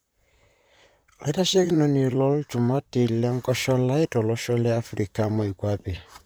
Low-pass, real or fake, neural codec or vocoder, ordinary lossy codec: none; fake; vocoder, 44.1 kHz, 128 mel bands, Pupu-Vocoder; none